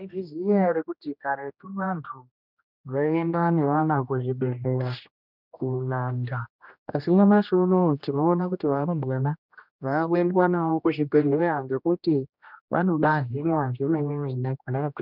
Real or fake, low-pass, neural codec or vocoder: fake; 5.4 kHz; codec, 16 kHz, 1 kbps, X-Codec, HuBERT features, trained on general audio